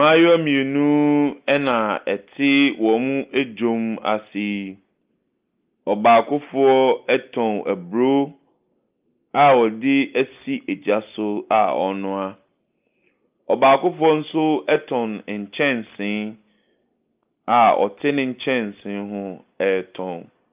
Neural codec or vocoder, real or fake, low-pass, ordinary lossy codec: none; real; 3.6 kHz; Opus, 24 kbps